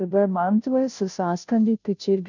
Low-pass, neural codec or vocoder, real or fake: 7.2 kHz; codec, 16 kHz, 0.5 kbps, FunCodec, trained on Chinese and English, 25 frames a second; fake